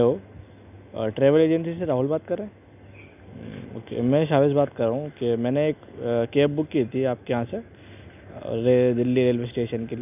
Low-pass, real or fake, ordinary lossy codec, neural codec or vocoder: 3.6 kHz; real; none; none